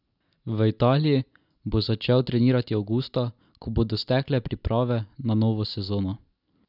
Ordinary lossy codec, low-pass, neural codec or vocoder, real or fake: none; 5.4 kHz; none; real